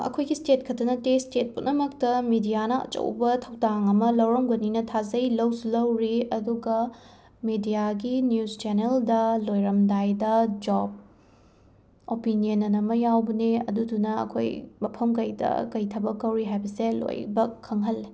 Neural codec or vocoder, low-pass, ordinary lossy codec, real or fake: none; none; none; real